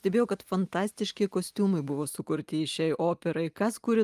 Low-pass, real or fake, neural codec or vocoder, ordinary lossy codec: 14.4 kHz; real; none; Opus, 32 kbps